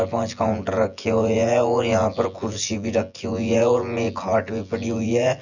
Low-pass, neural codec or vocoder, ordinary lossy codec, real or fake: 7.2 kHz; vocoder, 24 kHz, 100 mel bands, Vocos; none; fake